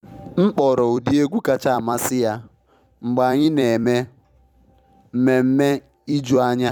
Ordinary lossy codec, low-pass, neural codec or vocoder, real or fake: none; 19.8 kHz; vocoder, 44.1 kHz, 128 mel bands every 256 samples, BigVGAN v2; fake